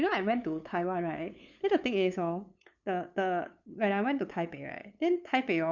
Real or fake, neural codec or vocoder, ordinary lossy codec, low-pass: fake; codec, 16 kHz, 8 kbps, FunCodec, trained on LibriTTS, 25 frames a second; none; 7.2 kHz